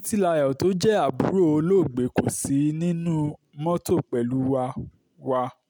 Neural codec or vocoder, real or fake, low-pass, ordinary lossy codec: none; real; none; none